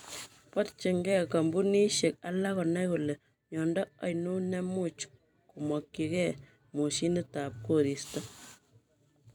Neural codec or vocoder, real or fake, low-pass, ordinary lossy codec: vocoder, 44.1 kHz, 128 mel bands every 256 samples, BigVGAN v2; fake; none; none